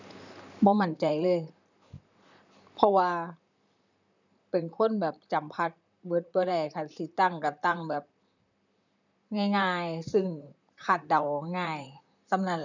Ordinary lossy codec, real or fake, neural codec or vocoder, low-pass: none; fake; vocoder, 44.1 kHz, 128 mel bands, Pupu-Vocoder; 7.2 kHz